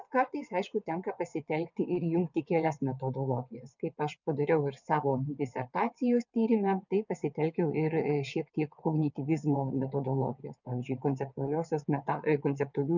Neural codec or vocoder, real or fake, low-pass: vocoder, 22.05 kHz, 80 mel bands, WaveNeXt; fake; 7.2 kHz